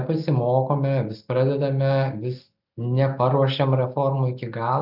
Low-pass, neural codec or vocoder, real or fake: 5.4 kHz; none; real